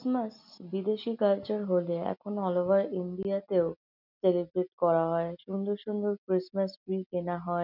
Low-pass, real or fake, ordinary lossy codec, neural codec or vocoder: 5.4 kHz; real; none; none